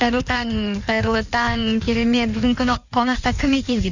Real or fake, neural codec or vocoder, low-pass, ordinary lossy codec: fake; codec, 16 kHz in and 24 kHz out, 1.1 kbps, FireRedTTS-2 codec; 7.2 kHz; none